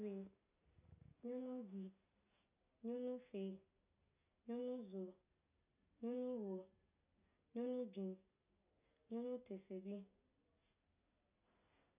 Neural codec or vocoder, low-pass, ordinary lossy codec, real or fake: none; 3.6 kHz; none; real